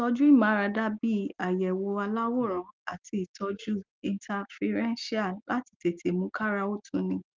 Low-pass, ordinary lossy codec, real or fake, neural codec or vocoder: 7.2 kHz; Opus, 32 kbps; real; none